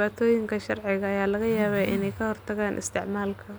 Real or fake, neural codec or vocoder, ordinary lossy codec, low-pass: real; none; none; none